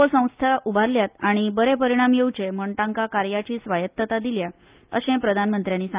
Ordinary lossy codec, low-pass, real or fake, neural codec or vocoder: Opus, 24 kbps; 3.6 kHz; real; none